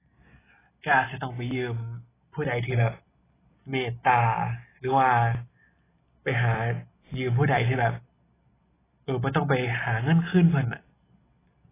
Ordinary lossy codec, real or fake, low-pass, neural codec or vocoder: AAC, 16 kbps; real; 3.6 kHz; none